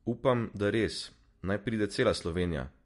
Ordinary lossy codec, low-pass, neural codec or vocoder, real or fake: MP3, 48 kbps; 14.4 kHz; none; real